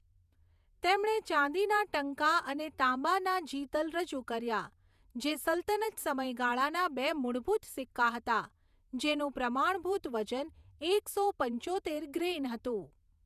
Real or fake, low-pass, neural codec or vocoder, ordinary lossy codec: fake; 14.4 kHz; vocoder, 48 kHz, 128 mel bands, Vocos; none